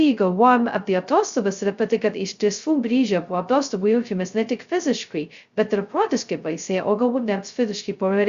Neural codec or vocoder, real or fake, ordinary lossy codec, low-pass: codec, 16 kHz, 0.2 kbps, FocalCodec; fake; Opus, 64 kbps; 7.2 kHz